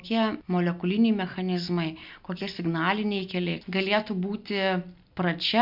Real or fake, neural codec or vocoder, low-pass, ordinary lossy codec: real; none; 5.4 kHz; MP3, 48 kbps